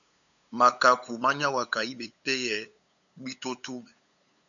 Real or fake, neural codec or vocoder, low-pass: fake; codec, 16 kHz, 8 kbps, FunCodec, trained on LibriTTS, 25 frames a second; 7.2 kHz